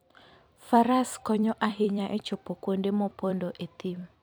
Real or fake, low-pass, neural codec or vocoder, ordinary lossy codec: fake; none; vocoder, 44.1 kHz, 128 mel bands every 256 samples, BigVGAN v2; none